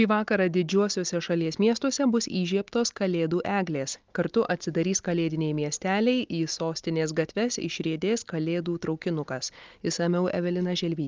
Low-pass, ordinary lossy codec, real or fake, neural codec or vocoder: 7.2 kHz; Opus, 32 kbps; real; none